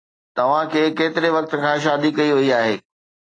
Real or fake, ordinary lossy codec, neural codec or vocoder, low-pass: real; AAC, 32 kbps; none; 9.9 kHz